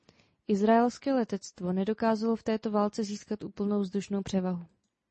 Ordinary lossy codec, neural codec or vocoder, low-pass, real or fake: MP3, 32 kbps; none; 10.8 kHz; real